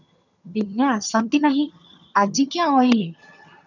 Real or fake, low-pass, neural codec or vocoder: fake; 7.2 kHz; vocoder, 22.05 kHz, 80 mel bands, HiFi-GAN